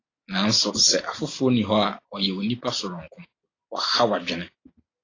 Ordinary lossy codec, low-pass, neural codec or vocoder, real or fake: AAC, 32 kbps; 7.2 kHz; none; real